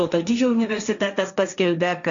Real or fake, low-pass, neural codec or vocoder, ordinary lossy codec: fake; 7.2 kHz; codec, 16 kHz, 1.1 kbps, Voila-Tokenizer; MP3, 64 kbps